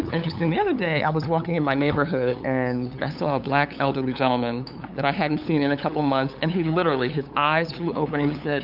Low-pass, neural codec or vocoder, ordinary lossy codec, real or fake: 5.4 kHz; codec, 16 kHz, 8 kbps, FunCodec, trained on LibriTTS, 25 frames a second; AAC, 48 kbps; fake